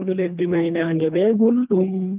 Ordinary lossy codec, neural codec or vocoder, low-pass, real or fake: Opus, 32 kbps; codec, 24 kHz, 1.5 kbps, HILCodec; 3.6 kHz; fake